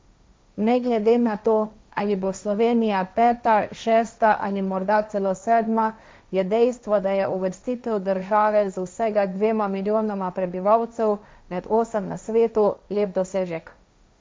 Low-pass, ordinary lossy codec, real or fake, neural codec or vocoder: none; none; fake; codec, 16 kHz, 1.1 kbps, Voila-Tokenizer